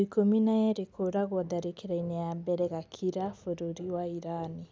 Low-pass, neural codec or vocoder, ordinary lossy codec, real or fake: none; none; none; real